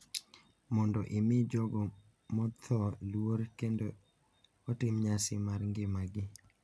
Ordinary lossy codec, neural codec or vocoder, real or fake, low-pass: none; none; real; none